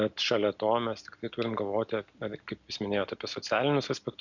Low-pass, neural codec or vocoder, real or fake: 7.2 kHz; none; real